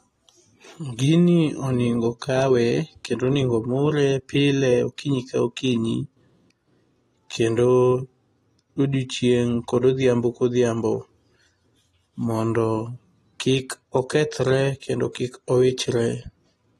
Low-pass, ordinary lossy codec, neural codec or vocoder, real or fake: 14.4 kHz; AAC, 32 kbps; none; real